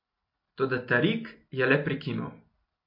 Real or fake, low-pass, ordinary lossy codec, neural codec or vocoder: real; 5.4 kHz; MP3, 32 kbps; none